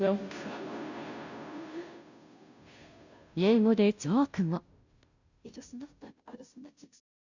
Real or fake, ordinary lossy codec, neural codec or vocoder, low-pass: fake; none; codec, 16 kHz, 0.5 kbps, FunCodec, trained on Chinese and English, 25 frames a second; 7.2 kHz